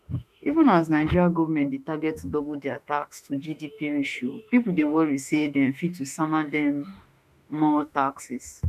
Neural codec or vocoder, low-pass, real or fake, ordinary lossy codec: autoencoder, 48 kHz, 32 numbers a frame, DAC-VAE, trained on Japanese speech; 14.4 kHz; fake; none